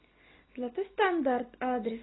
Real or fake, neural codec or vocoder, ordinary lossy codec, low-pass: real; none; AAC, 16 kbps; 7.2 kHz